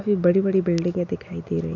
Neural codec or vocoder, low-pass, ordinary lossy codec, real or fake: none; 7.2 kHz; none; real